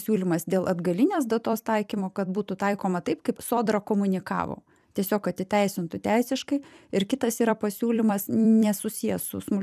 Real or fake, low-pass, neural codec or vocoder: fake; 14.4 kHz; vocoder, 44.1 kHz, 128 mel bands every 256 samples, BigVGAN v2